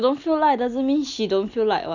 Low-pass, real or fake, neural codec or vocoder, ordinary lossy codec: 7.2 kHz; real; none; none